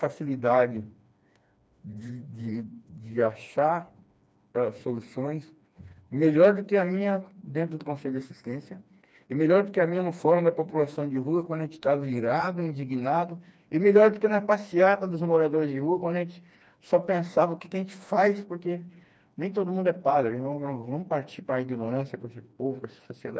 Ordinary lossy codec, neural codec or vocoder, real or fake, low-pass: none; codec, 16 kHz, 2 kbps, FreqCodec, smaller model; fake; none